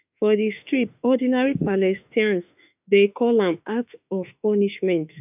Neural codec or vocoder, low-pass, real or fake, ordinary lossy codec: autoencoder, 48 kHz, 32 numbers a frame, DAC-VAE, trained on Japanese speech; 3.6 kHz; fake; none